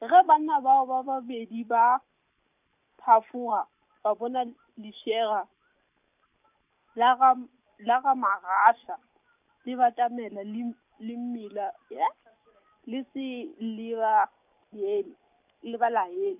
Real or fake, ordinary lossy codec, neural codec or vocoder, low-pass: real; none; none; 3.6 kHz